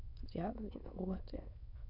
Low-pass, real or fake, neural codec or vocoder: 5.4 kHz; fake; autoencoder, 22.05 kHz, a latent of 192 numbers a frame, VITS, trained on many speakers